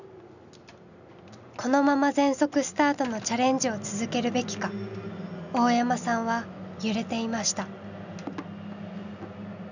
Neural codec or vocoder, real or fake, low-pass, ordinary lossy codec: none; real; 7.2 kHz; none